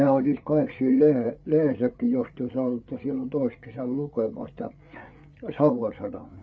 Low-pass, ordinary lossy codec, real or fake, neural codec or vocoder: none; none; fake; codec, 16 kHz, 8 kbps, FreqCodec, larger model